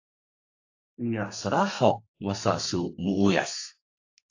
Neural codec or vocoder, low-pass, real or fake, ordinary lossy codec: codec, 32 kHz, 1.9 kbps, SNAC; 7.2 kHz; fake; AAC, 48 kbps